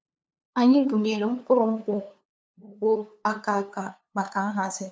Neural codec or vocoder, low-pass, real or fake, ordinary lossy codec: codec, 16 kHz, 2 kbps, FunCodec, trained on LibriTTS, 25 frames a second; none; fake; none